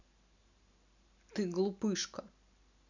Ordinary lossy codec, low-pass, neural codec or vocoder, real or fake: none; 7.2 kHz; none; real